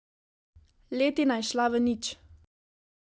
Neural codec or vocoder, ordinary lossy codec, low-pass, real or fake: none; none; none; real